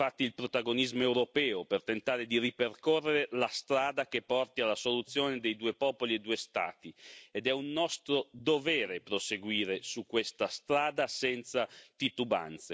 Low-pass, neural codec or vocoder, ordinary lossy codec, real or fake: none; none; none; real